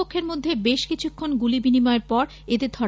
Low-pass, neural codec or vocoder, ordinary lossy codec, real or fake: none; none; none; real